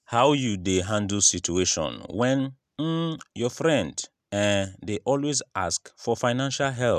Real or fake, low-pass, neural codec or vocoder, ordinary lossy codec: real; 14.4 kHz; none; none